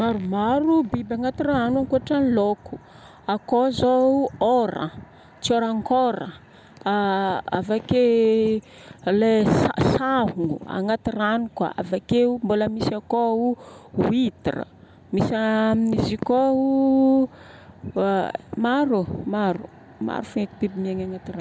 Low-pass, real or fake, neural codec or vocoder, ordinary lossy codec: none; real; none; none